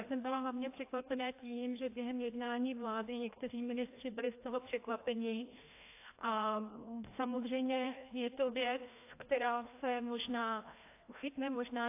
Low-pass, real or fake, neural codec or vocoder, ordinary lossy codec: 3.6 kHz; fake; codec, 16 kHz, 1 kbps, FreqCodec, larger model; AAC, 24 kbps